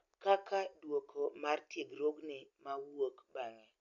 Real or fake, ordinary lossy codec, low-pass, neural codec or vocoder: real; none; 7.2 kHz; none